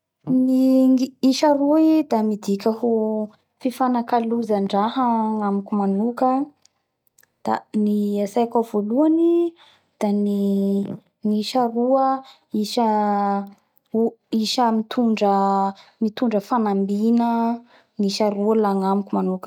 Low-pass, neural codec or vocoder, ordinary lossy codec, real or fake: 19.8 kHz; none; none; real